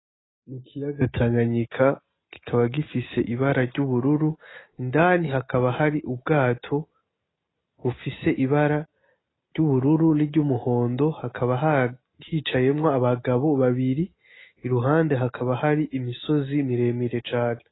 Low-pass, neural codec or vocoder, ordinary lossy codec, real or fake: 7.2 kHz; none; AAC, 16 kbps; real